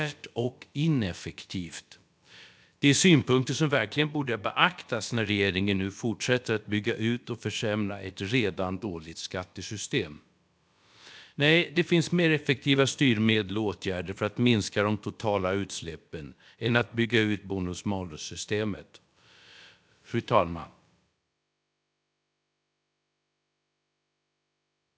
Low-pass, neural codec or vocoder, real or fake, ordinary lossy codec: none; codec, 16 kHz, about 1 kbps, DyCAST, with the encoder's durations; fake; none